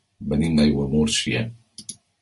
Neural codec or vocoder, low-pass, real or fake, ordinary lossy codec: none; 14.4 kHz; real; MP3, 48 kbps